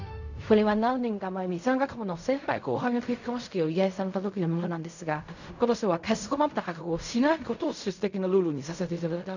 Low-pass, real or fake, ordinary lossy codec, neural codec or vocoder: 7.2 kHz; fake; none; codec, 16 kHz in and 24 kHz out, 0.4 kbps, LongCat-Audio-Codec, fine tuned four codebook decoder